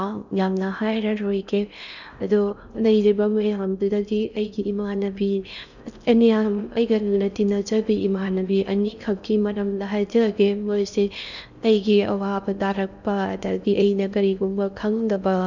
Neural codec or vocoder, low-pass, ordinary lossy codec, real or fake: codec, 16 kHz in and 24 kHz out, 0.6 kbps, FocalCodec, streaming, 4096 codes; 7.2 kHz; none; fake